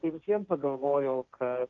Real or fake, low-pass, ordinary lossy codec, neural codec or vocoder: fake; 7.2 kHz; Opus, 24 kbps; codec, 16 kHz, 1.1 kbps, Voila-Tokenizer